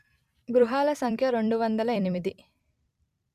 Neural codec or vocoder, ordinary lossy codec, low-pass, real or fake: vocoder, 44.1 kHz, 128 mel bands every 256 samples, BigVGAN v2; Opus, 64 kbps; 14.4 kHz; fake